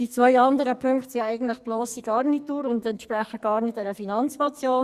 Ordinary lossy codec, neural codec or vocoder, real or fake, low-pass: Opus, 64 kbps; codec, 44.1 kHz, 2.6 kbps, SNAC; fake; 14.4 kHz